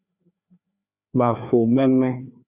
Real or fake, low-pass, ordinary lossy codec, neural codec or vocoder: fake; 3.6 kHz; Opus, 64 kbps; codec, 16 kHz, 2 kbps, FreqCodec, larger model